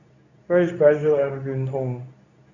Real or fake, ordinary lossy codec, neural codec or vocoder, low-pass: fake; none; codec, 24 kHz, 0.9 kbps, WavTokenizer, medium speech release version 2; 7.2 kHz